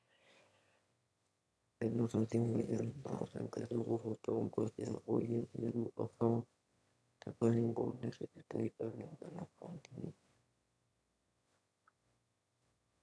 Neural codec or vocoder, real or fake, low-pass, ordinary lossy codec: autoencoder, 22.05 kHz, a latent of 192 numbers a frame, VITS, trained on one speaker; fake; none; none